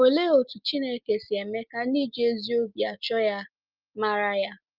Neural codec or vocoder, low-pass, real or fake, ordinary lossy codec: none; 5.4 kHz; real; Opus, 24 kbps